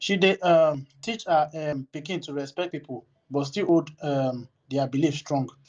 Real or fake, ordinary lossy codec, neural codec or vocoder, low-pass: real; Opus, 24 kbps; none; 7.2 kHz